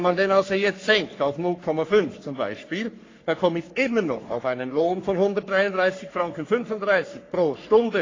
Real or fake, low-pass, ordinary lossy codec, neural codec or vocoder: fake; 7.2 kHz; AAC, 32 kbps; codec, 44.1 kHz, 3.4 kbps, Pupu-Codec